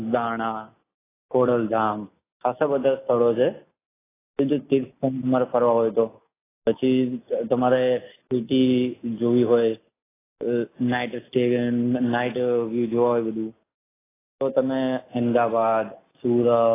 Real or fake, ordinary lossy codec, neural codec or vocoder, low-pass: real; AAC, 16 kbps; none; 3.6 kHz